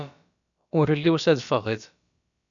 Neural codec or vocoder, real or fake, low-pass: codec, 16 kHz, about 1 kbps, DyCAST, with the encoder's durations; fake; 7.2 kHz